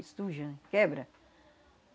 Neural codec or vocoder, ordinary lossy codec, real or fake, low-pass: none; none; real; none